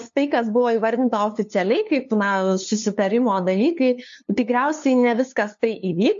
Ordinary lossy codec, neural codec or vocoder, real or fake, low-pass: MP3, 48 kbps; codec, 16 kHz, 2 kbps, FunCodec, trained on LibriTTS, 25 frames a second; fake; 7.2 kHz